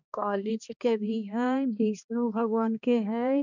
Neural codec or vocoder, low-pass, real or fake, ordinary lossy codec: codec, 16 kHz, 2 kbps, X-Codec, HuBERT features, trained on balanced general audio; 7.2 kHz; fake; none